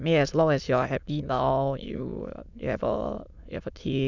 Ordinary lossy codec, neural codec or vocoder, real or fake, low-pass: none; autoencoder, 22.05 kHz, a latent of 192 numbers a frame, VITS, trained on many speakers; fake; 7.2 kHz